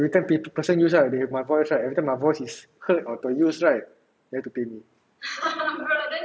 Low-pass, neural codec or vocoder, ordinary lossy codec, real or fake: none; none; none; real